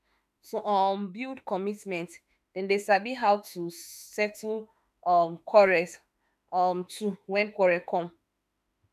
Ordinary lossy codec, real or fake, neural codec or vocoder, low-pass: none; fake; autoencoder, 48 kHz, 32 numbers a frame, DAC-VAE, trained on Japanese speech; 14.4 kHz